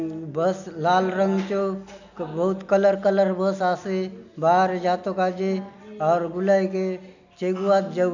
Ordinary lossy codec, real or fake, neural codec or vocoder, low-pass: none; real; none; 7.2 kHz